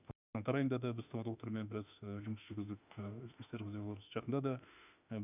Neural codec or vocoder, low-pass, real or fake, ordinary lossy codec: autoencoder, 48 kHz, 32 numbers a frame, DAC-VAE, trained on Japanese speech; 3.6 kHz; fake; none